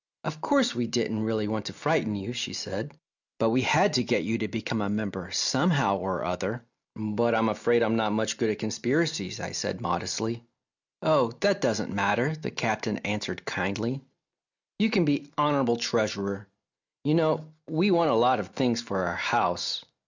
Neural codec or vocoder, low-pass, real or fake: none; 7.2 kHz; real